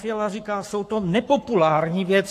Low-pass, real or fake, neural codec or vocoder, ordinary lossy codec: 14.4 kHz; fake; codec, 44.1 kHz, 7.8 kbps, Pupu-Codec; AAC, 64 kbps